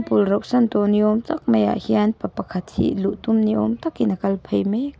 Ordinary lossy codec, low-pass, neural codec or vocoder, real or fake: none; none; none; real